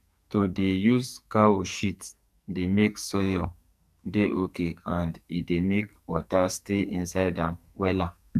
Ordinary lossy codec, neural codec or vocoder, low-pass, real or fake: none; codec, 44.1 kHz, 2.6 kbps, SNAC; 14.4 kHz; fake